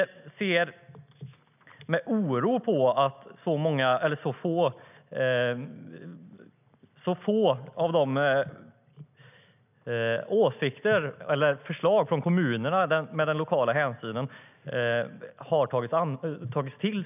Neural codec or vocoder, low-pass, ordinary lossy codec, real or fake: none; 3.6 kHz; none; real